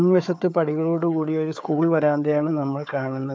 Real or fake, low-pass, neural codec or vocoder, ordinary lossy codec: fake; none; codec, 16 kHz, 4 kbps, FunCodec, trained on Chinese and English, 50 frames a second; none